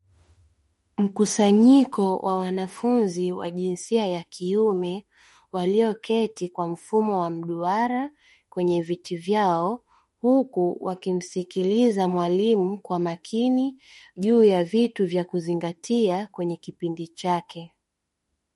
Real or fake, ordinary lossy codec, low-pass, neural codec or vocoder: fake; MP3, 48 kbps; 19.8 kHz; autoencoder, 48 kHz, 32 numbers a frame, DAC-VAE, trained on Japanese speech